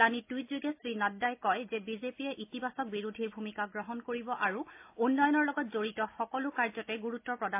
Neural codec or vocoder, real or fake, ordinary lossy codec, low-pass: none; real; MP3, 24 kbps; 3.6 kHz